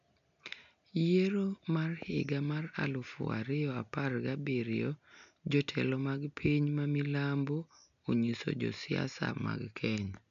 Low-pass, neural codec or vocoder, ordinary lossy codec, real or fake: 7.2 kHz; none; none; real